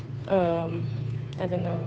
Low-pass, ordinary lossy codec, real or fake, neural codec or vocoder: none; none; fake; codec, 16 kHz, 2 kbps, FunCodec, trained on Chinese and English, 25 frames a second